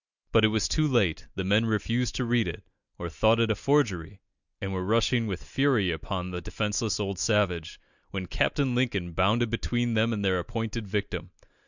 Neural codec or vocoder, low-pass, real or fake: none; 7.2 kHz; real